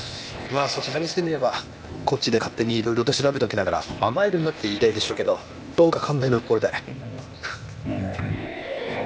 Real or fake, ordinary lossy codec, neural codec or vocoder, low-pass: fake; none; codec, 16 kHz, 0.8 kbps, ZipCodec; none